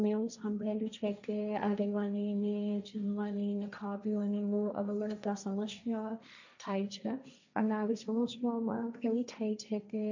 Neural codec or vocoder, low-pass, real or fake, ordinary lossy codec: codec, 16 kHz, 1.1 kbps, Voila-Tokenizer; 7.2 kHz; fake; none